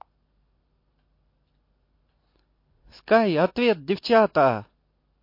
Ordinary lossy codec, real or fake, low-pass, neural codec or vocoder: MP3, 32 kbps; real; 5.4 kHz; none